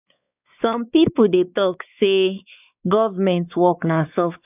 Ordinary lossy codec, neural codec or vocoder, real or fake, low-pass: none; codec, 16 kHz, 4 kbps, X-Codec, WavLM features, trained on Multilingual LibriSpeech; fake; 3.6 kHz